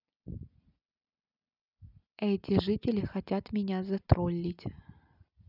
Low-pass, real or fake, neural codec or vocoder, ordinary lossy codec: 5.4 kHz; real; none; none